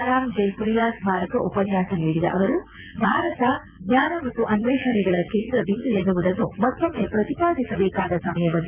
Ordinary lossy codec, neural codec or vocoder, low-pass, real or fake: none; vocoder, 22.05 kHz, 80 mel bands, WaveNeXt; 3.6 kHz; fake